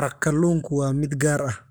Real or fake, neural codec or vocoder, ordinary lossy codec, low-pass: fake; codec, 44.1 kHz, 7.8 kbps, Pupu-Codec; none; none